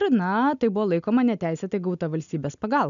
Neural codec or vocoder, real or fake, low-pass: none; real; 7.2 kHz